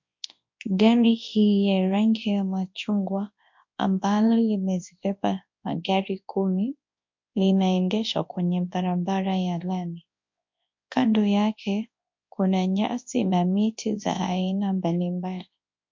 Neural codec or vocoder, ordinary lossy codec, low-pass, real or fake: codec, 24 kHz, 0.9 kbps, WavTokenizer, large speech release; MP3, 48 kbps; 7.2 kHz; fake